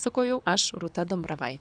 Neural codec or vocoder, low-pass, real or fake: codec, 24 kHz, 6 kbps, HILCodec; 9.9 kHz; fake